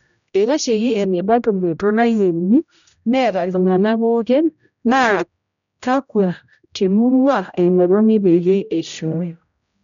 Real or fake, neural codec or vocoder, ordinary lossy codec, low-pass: fake; codec, 16 kHz, 0.5 kbps, X-Codec, HuBERT features, trained on general audio; none; 7.2 kHz